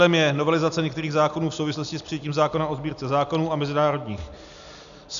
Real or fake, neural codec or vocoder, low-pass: real; none; 7.2 kHz